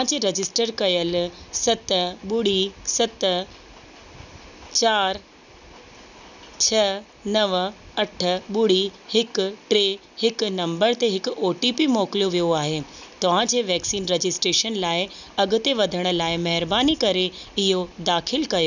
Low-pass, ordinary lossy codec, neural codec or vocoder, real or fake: 7.2 kHz; none; none; real